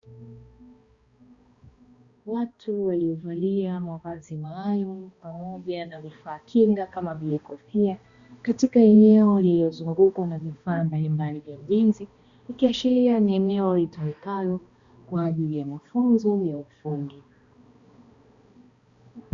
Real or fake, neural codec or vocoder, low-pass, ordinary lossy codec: fake; codec, 16 kHz, 1 kbps, X-Codec, HuBERT features, trained on balanced general audio; 7.2 kHz; Opus, 64 kbps